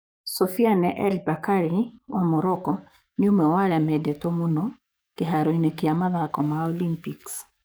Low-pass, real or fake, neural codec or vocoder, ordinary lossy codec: none; fake; codec, 44.1 kHz, 7.8 kbps, DAC; none